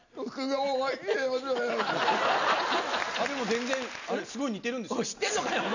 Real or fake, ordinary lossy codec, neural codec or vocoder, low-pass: real; none; none; 7.2 kHz